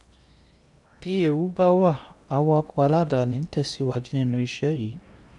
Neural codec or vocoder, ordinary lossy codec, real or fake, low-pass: codec, 16 kHz in and 24 kHz out, 0.8 kbps, FocalCodec, streaming, 65536 codes; none; fake; 10.8 kHz